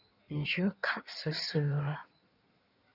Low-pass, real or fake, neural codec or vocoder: 5.4 kHz; fake; codec, 16 kHz in and 24 kHz out, 1.1 kbps, FireRedTTS-2 codec